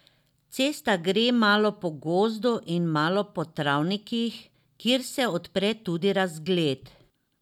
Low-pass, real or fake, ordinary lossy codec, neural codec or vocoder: 19.8 kHz; real; none; none